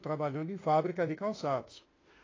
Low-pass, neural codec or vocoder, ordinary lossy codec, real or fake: 7.2 kHz; autoencoder, 48 kHz, 32 numbers a frame, DAC-VAE, trained on Japanese speech; AAC, 32 kbps; fake